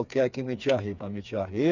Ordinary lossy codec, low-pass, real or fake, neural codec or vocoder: none; 7.2 kHz; fake; codec, 16 kHz, 4 kbps, FreqCodec, smaller model